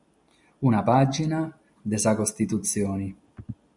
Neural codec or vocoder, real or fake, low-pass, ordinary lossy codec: none; real; 10.8 kHz; MP3, 96 kbps